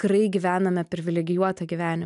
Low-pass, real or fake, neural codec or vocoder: 10.8 kHz; real; none